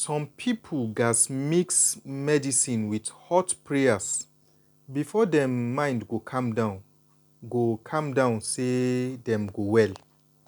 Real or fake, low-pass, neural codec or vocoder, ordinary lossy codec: real; 19.8 kHz; none; none